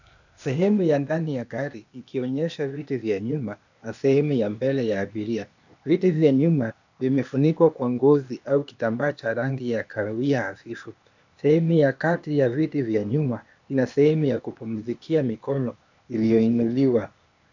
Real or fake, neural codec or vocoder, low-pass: fake; codec, 16 kHz, 0.8 kbps, ZipCodec; 7.2 kHz